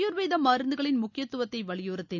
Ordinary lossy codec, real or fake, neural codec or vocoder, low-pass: none; real; none; 7.2 kHz